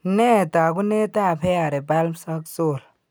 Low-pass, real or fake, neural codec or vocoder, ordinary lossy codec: none; real; none; none